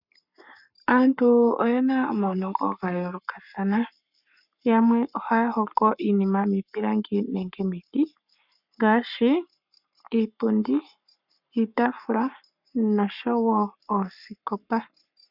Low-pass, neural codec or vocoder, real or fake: 5.4 kHz; codec, 44.1 kHz, 7.8 kbps, Pupu-Codec; fake